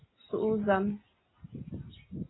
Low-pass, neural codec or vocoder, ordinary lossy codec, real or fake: 7.2 kHz; none; AAC, 16 kbps; real